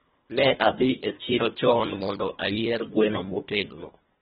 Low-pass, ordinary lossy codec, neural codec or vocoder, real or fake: 10.8 kHz; AAC, 16 kbps; codec, 24 kHz, 1.5 kbps, HILCodec; fake